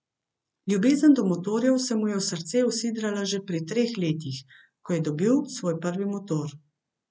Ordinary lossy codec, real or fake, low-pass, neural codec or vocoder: none; real; none; none